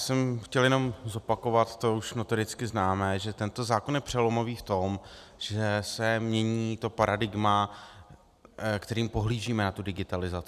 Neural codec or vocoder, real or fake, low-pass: none; real; 14.4 kHz